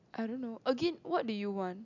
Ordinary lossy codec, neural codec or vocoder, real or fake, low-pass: none; none; real; 7.2 kHz